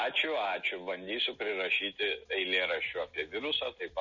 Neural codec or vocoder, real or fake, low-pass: none; real; 7.2 kHz